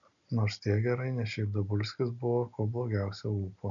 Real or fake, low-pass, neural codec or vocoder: real; 7.2 kHz; none